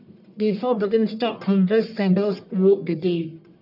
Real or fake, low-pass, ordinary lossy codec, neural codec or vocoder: fake; 5.4 kHz; none; codec, 44.1 kHz, 1.7 kbps, Pupu-Codec